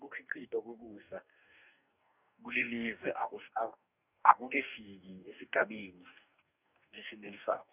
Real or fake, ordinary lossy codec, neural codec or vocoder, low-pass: fake; none; codec, 44.1 kHz, 2.6 kbps, DAC; 3.6 kHz